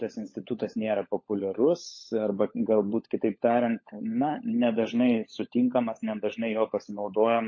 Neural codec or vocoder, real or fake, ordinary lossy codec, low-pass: codec, 16 kHz, 16 kbps, FunCodec, trained on LibriTTS, 50 frames a second; fake; MP3, 32 kbps; 7.2 kHz